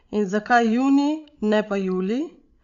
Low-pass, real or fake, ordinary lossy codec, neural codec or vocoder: 7.2 kHz; fake; AAC, 64 kbps; codec, 16 kHz, 16 kbps, FreqCodec, larger model